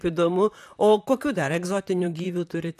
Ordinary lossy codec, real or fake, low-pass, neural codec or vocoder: AAC, 96 kbps; fake; 14.4 kHz; vocoder, 44.1 kHz, 128 mel bands, Pupu-Vocoder